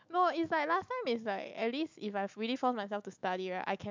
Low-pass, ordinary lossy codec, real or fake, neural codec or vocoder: 7.2 kHz; MP3, 64 kbps; fake; autoencoder, 48 kHz, 128 numbers a frame, DAC-VAE, trained on Japanese speech